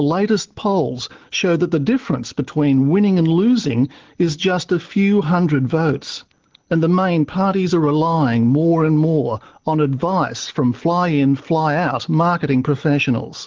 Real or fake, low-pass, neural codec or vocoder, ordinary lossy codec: real; 7.2 kHz; none; Opus, 16 kbps